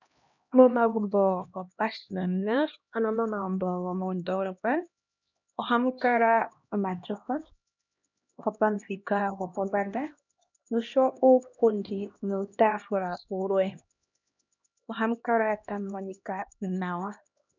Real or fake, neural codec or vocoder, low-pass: fake; codec, 16 kHz, 1 kbps, X-Codec, HuBERT features, trained on LibriSpeech; 7.2 kHz